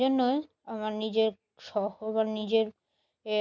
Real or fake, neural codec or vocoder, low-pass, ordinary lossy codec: real; none; 7.2 kHz; none